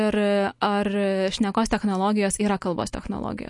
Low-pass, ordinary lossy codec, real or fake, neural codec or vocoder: 10.8 kHz; MP3, 48 kbps; real; none